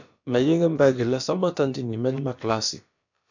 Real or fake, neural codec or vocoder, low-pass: fake; codec, 16 kHz, about 1 kbps, DyCAST, with the encoder's durations; 7.2 kHz